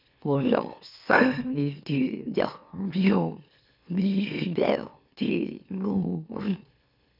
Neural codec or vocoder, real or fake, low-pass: autoencoder, 44.1 kHz, a latent of 192 numbers a frame, MeloTTS; fake; 5.4 kHz